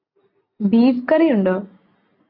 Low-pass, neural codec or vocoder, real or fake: 5.4 kHz; none; real